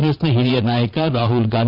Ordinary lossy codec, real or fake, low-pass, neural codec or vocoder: Opus, 64 kbps; fake; 5.4 kHz; vocoder, 22.05 kHz, 80 mel bands, Vocos